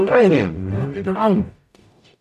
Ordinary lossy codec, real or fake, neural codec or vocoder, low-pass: none; fake; codec, 44.1 kHz, 0.9 kbps, DAC; 14.4 kHz